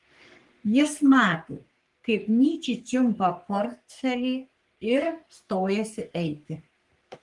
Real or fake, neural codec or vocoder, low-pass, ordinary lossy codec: fake; codec, 44.1 kHz, 3.4 kbps, Pupu-Codec; 10.8 kHz; Opus, 24 kbps